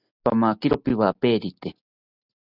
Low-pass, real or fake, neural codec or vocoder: 5.4 kHz; real; none